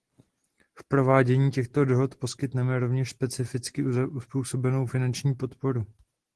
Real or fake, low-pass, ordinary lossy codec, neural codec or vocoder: real; 10.8 kHz; Opus, 16 kbps; none